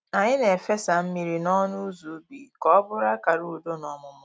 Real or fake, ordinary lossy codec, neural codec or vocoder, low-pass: real; none; none; none